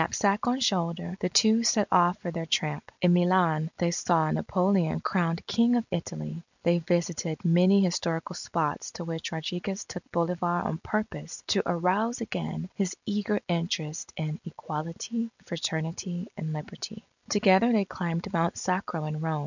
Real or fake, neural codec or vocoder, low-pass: real; none; 7.2 kHz